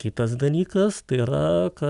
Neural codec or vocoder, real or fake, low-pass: none; real; 10.8 kHz